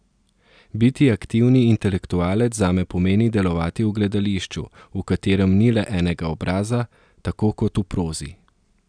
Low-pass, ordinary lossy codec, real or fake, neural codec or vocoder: 9.9 kHz; none; real; none